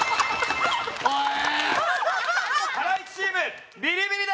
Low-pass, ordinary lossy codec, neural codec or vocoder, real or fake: none; none; none; real